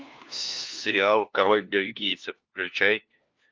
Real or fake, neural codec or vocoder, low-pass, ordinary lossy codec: fake; codec, 16 kHz, 1 kbps, FunCodec, trained on LibriTTS, 50 frames a second; 7.2 kHz; Opus, 32 kbps